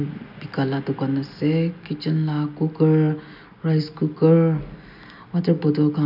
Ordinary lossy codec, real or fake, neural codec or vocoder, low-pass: none; real; none; 5.4 kHz